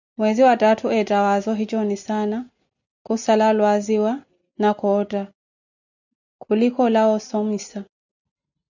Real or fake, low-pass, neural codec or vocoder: real; 7.2 kHz; none